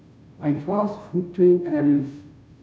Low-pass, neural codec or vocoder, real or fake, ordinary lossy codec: none; codec, 16 kHz, 0.5 kbps, FunCodec, trained on Chinese and English, 25 frames a second; fake; none